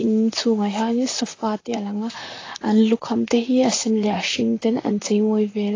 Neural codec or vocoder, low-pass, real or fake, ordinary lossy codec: vocoder, 44.1 kHz, 128 mel bands, Pupu-Vocoder; 7.2 kHz; fake; AAC, 32 kbps